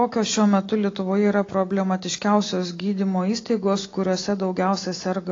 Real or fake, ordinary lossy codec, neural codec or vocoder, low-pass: real; AAC, 32 kbps; none; 7.2 kHz